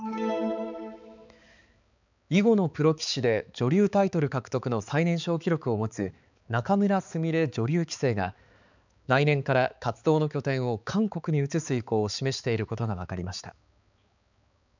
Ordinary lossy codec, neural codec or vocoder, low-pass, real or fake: none; codec, 16 kHz, 4 kbps, X-Codec, HuBERT features, trained on balanced general audio; 7.2 kHz; fake